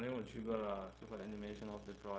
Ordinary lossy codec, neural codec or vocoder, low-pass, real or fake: none; codec, 16 kHz, 0.4 kbps, LongCat-Audio-Codec; none; fake